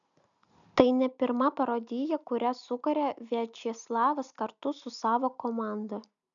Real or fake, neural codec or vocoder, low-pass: real; none; 7.2 kHz